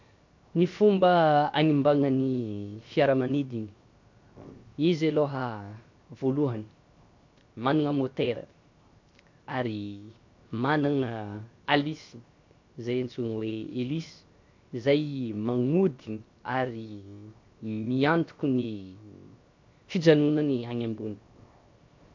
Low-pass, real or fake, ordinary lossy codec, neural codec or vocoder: 7.2 kHz; fake; MP3, 48 kbps; codec, 16 kHz, 0.7 kbps, FocalCodec